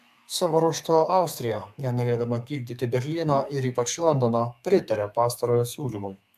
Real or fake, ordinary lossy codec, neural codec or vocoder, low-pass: fake; AAC, 96 kbps; codec, 32 kHz, 1.9 kbps, SNAC; 14.4 kHz